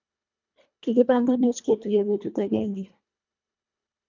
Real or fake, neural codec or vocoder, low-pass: fake; codec, 24 kHz, 1.5 kbps, HILCodec; 7.2 kHz